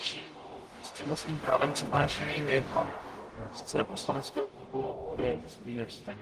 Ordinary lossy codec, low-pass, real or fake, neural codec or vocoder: Opus, 24 kbps; 14.4 kHz; fake; codec, 44.1 kHz, 0.9 kbps, DAC